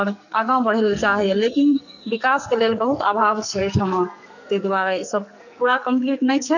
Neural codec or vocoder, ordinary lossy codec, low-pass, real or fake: codec, 44.1 kHz, 2.6 kbps, SNAC; none; 7.2 kHz; fake